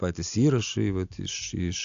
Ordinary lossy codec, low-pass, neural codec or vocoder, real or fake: AAC, 64 kbps; 7.2 kHz; none; real